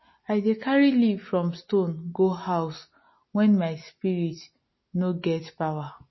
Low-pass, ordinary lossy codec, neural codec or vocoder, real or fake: 7.2 kHz; MP3, 24 kbps; none; real